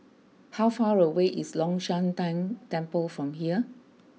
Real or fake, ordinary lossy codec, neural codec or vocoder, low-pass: real; none; none; none